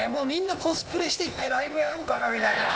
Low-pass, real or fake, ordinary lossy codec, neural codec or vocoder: none; fake; none; codec, 16 kHz, 0.8 kbps, ZipCodec